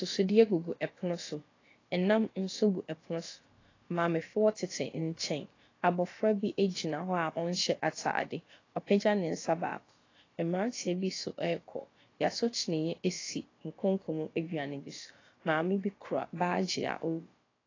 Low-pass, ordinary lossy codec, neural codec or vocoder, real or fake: 7.2 kHz; AAC, 32 kbps; codec, 16 kHz, about 1 kbps, DyCAST, with the encoder's durations; fake